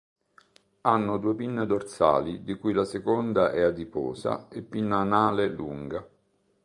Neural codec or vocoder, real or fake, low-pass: none; real; 10.8 kHz